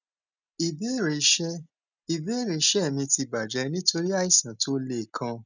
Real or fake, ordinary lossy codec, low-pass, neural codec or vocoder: real; none; 7.2 kHz; none